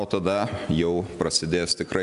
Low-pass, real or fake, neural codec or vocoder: 10.8 kHz; real; none